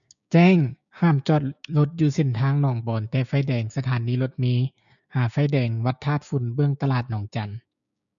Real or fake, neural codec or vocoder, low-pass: fake; codec, 16 kHz, 6 kbps, DAC; 7.2 kHz